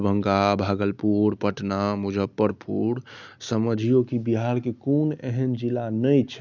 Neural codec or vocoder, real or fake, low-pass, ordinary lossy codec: none; real; 7.2 kHz; none